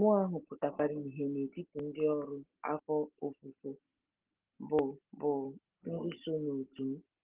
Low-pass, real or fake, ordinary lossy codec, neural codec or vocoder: 3.6 kHz; real; Opus, 16 kbps; none